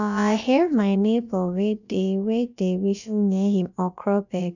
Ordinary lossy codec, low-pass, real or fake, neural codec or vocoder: none; 7.2 kHz; fake; codec, 16 kHz, about 1 kbps, DyCAST, with the encoder's durations